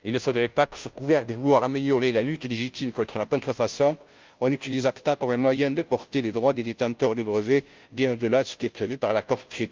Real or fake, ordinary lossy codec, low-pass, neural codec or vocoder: fake; Opus, 24 kbps; 7.2 kHz; codec, 16 kHz, 0.5 kbps, FunCodec, trained on Chinese and English, 25 frames a second